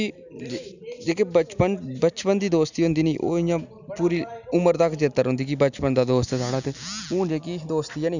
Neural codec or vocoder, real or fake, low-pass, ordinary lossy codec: none; real; 7.2 kHz; none